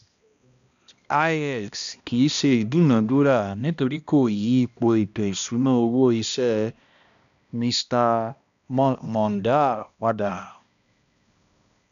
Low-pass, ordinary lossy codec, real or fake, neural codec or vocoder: 7.2 kHz; none; fake; codec, 16 kHz, 1 kbps, X-Codec, HuBERT features, trained on balanced general audio